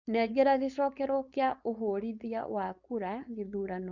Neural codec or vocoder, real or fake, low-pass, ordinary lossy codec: codec, 16 kHz, 4.8 kbps, FACodec; fake; 7.2 kHz; Opus, 64 kbps